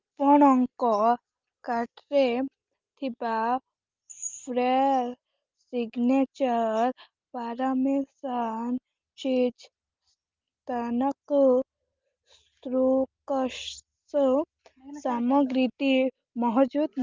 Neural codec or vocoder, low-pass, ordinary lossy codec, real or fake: none; 7.2 kHz; Opus, 32 kbps; real